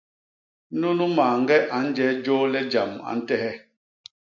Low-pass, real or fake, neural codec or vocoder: 7.2 kHz; real; none